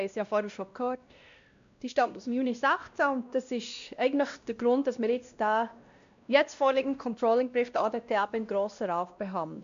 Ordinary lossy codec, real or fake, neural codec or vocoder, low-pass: MP3, 64 kbps; fake; codec, 16 kHz, 1 kbps, X-Codec, WavLM features, trained on Multilingual LibriSpeech; 7.2 kHz